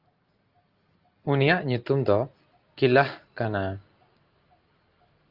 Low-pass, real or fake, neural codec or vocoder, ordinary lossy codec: 5.4 kHz; real; none; Opus, 24 kbps